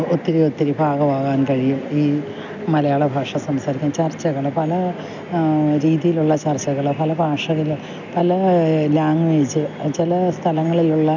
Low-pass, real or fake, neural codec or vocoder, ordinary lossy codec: 7.2 kHz; real; none; none